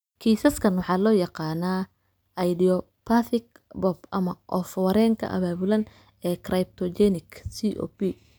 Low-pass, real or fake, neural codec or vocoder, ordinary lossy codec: none; real; none; none